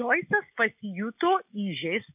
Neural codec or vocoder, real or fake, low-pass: none; real; 3.6 kHz